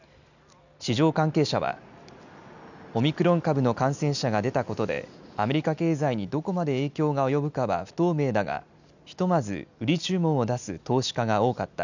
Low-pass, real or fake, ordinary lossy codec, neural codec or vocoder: 7.2 kHz; real; none; none